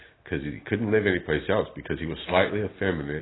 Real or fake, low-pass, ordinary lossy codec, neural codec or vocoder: real; 7.2 kHz; AAC, 16 kbps; none